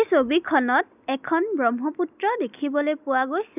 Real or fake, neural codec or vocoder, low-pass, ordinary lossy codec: real; none; 3.6 kHz; none